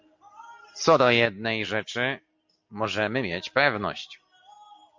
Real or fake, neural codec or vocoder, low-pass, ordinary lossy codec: real; none; 7.2 kHz; MP3, 48 kbps